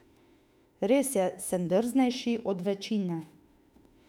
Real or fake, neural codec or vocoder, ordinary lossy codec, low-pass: fake; autoencoder, 48 kHz, 32 numbers a frame, DAC-VAE, trained on Japanese speech; none; 19.8 kHz